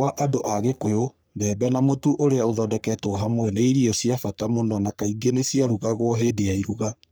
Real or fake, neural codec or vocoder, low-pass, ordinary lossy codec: fake; codec, 44.1 kHz, 3.4 kbps, Pupu-Codec; none; none